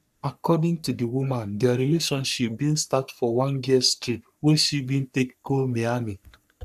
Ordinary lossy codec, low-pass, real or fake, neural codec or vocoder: none; 14.4 kHz; fake; codec, 44.1 kHz, 3.4 kbps, Pupu-Codec